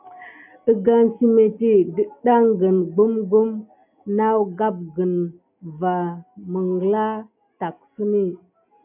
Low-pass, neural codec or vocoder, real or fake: 3.6 kHz; none; real